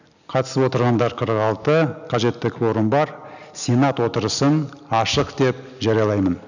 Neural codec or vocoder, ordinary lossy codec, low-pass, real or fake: none; none; 7.2 kHz; real